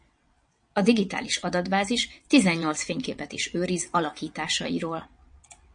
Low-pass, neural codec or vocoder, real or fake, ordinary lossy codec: 9.9 kHz; vocoder, 22.05 kHz, 80 mel bands, WaveNeXt; fake; MP3, 48 kbps